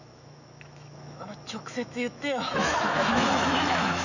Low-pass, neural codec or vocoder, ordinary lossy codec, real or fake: 7.2 kHz; autoencoder, 48 kHz, 128 numbers a frame, DAC-VAE, trained on Japanese speech; none; fake